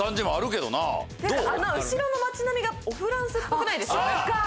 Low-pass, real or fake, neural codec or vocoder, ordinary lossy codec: none; real; none; none